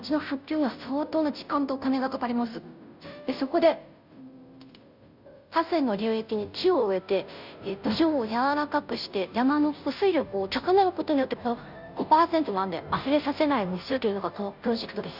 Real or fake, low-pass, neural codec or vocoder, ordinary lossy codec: fake; 5.4 kHz; codec, 16 kHz, 0.5 kbps, FunCodec, trained on Chinese and English, 25 frames a second; none